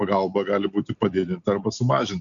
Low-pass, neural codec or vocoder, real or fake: 7.2 kHz; none; real